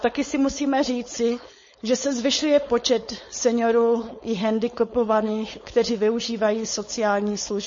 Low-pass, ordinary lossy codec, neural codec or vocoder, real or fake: 7.2 kHz; MP3, 32 kbps; codec, 16 kHz, 4.8 kbps, FACodec; fake